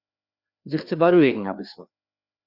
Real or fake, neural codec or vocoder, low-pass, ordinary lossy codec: fake; codec, 16 kHz, 2 kbps, FreqCodec, larger model; 5.4 kHz; Opus, 64 kbps